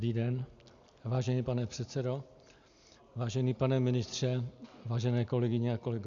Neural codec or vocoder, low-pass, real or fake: none; 7.2 kHz; real